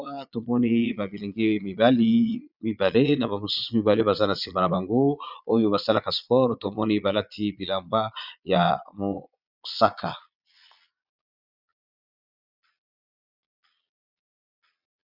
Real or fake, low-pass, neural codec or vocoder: fake; 5.4 kHz; vocoder, 22.05 kHz, 80 mel bands, Vocos